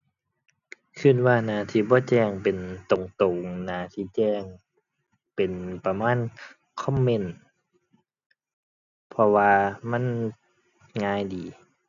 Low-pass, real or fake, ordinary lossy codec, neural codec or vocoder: 7.2 kHz; real; none; none